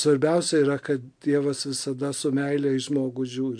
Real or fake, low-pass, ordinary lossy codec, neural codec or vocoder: real; 9.9 kHz; MP3, 64 kbps; none